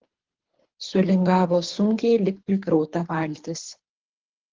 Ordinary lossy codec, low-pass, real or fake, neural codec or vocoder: Opus, 16 kbps; 7.2 kHz; fake; codec, 24 kHz, 3 kbps, HILCodec